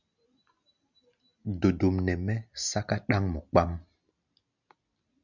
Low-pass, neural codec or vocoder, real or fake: 7.2 kHz; none; real